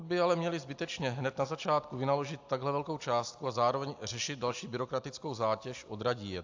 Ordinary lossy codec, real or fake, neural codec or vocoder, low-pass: AAC, 48 kbps; real; none; 7.2 kHz